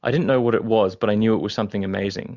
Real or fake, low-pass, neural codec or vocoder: real; 7.2 kHz; none